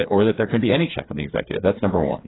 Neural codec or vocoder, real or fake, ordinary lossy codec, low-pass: codec, 16 kHz, 4 kbps, FreqCodec, smaller model; fake; AAC, 16 kbps; 7.2 kHz